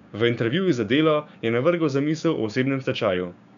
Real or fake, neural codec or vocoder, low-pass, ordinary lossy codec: fake; codec, 16 kHz, 6 kbps, DAC; 7.2 kHz; none